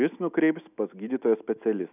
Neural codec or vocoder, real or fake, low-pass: none; real; 3.6 kHz